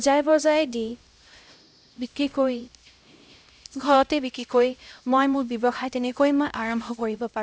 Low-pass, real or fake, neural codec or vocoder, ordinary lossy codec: none; fake; codec, 16 kHz, 0.5 kbps, X-Codec, HuBERT features, trained on LibriSpeech; none